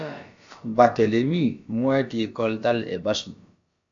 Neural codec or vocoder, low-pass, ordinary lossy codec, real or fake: codec, 16 kHz, about 1 kbps, DyCAST, with the encoder's durations; 7.2 kHz; AAC, 64 kbps; fake